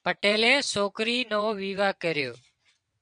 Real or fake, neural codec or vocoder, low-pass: fake; vocoder, 22.05 kHz, 80 mel bands, WaveNeXt; 9.9 kHz